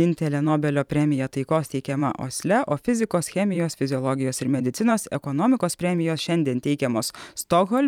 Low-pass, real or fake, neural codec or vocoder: 19.8 kHz; fake; vocoder, 44.1 kHz, 128 mel bands every 512 samples, BigVGAN v2